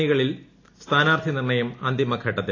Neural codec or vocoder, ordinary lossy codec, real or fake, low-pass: none; AAC, 32 kbps; real; 7.2 kHz